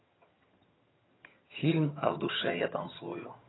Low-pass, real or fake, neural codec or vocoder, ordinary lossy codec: 7.2 kHz; fake; vocoder, 22.05 kHz, 80 mel bands, HiFi-GAN; AAC, 16 kbps